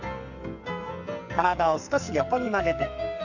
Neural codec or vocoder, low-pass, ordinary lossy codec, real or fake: codec, 44.1 kHz, 2.6 kbps, SNAC; 7.2 kHz; none; fake